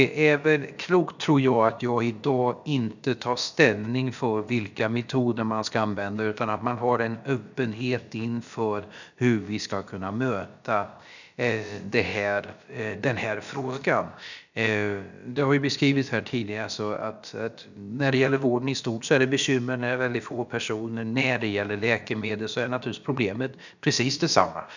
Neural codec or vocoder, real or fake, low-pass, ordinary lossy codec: codec, 16 kHz, about 1 kbps, DyCAST, with the encoder's durations; fake; 7.2 kHz; none